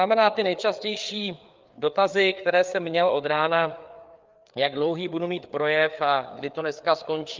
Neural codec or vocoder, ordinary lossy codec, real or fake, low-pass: codec, 16 kHz, 4 kbps, FreqCodec, larger model; Opus, 24 kbps; fake; 7.2 kHz